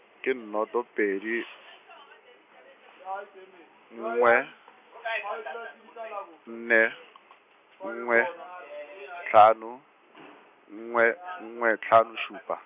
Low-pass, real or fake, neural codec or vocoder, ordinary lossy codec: 3.6 kHz; real; none; none